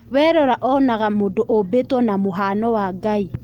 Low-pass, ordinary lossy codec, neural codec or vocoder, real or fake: 19.8 kHz; Opus, 24 kbps; none; real